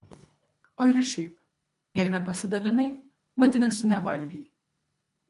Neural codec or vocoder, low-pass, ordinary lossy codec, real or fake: codec, 24 kHz, 1.5 kbps, HILCodec; 10.8 kHz; MP3, 64 kbps; fake